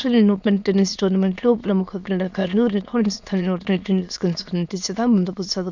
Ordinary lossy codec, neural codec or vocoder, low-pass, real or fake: none; autoencoder, 22.05 kHz, a latent of 192 numbers a frame, VITS, trained on many speakers; 7.2 kHz; fake